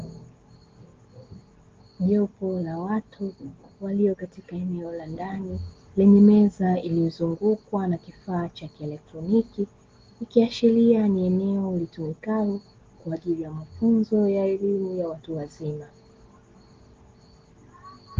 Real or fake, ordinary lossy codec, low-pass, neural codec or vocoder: real; Opus, 16 kbps; 7.2 kHz; none